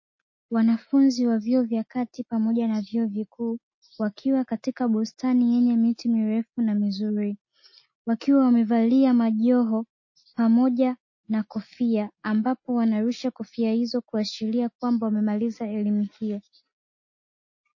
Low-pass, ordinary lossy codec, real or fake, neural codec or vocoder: 7.2 kHz; MP3, 32 kbps; real; none